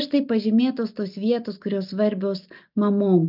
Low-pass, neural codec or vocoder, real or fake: 5.4 kHz; none; real